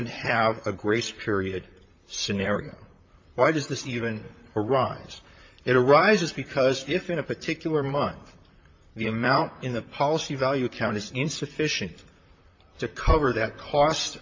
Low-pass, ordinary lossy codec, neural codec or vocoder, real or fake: 7.2 kHz; MP3, 48 kbps; vocoder, 44.1 kHz, 128 mel bands, Pupu-Vocoder; fake